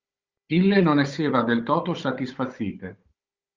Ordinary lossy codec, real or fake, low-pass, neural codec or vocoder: Opus, 32 kbps; fake; 7.2 kHz; codec, 16 kHz, 16 kbps, FunCodec, trained on Chinese and English, 50 frames a second